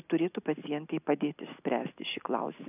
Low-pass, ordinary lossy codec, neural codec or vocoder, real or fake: 3.6 kHz; AAC, 32 kbps; none; real